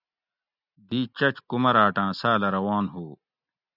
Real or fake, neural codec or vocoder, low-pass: real; none; 5.4 kHz